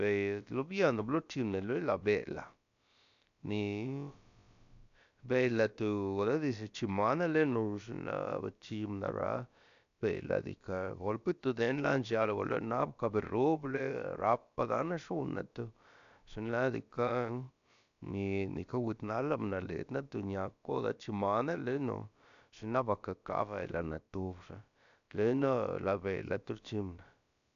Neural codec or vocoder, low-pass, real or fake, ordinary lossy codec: codec, 16 kHz, about 1 kbps, DyCAST, with the encoder's durations; 7.2 kHz; fake; AAC, 96 kbps